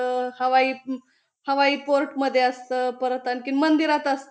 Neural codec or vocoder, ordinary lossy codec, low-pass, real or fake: none; none; none; real